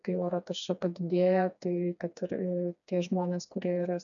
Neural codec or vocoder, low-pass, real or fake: codec, 16 kHz, 2 kbps, FreqCodec, smaller model; 7.2 kHz; fake